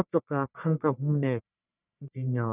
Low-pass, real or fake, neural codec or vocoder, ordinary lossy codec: 3.6 kHz; fake; codec, 44.1 kHz, 1.7 kbps, Pupu-Codec; none